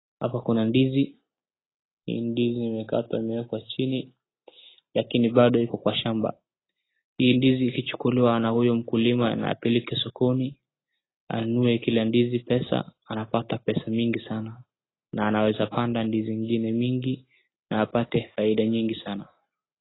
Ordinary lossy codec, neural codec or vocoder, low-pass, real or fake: AAC, 16 kbps; none; 7.2 kHz; real